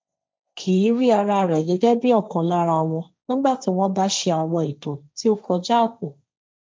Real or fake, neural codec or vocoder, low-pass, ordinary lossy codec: fake; codec, 16 kHz, 1.1 kbps, Voila-Tokenizer; none; none